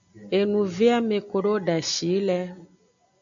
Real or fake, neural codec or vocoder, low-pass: real; none; 7.2 kHz